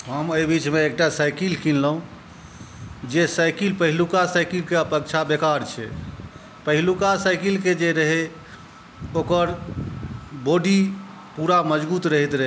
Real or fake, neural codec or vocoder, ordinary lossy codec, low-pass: real; none; none; none